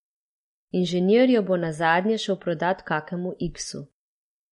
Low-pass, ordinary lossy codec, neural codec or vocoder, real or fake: 19.8 kHz; MP3, 48 kbps; none; real